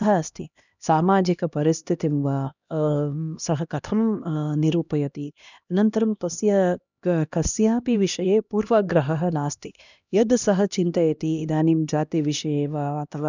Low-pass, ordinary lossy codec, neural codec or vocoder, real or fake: 7.2 kHz; none; codec, 16 kHz, 1 kbps, X-Codec, HuBERT features, trained on LibriSpeech; fake